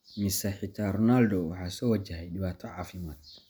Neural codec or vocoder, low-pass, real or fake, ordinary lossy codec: none; none; real; none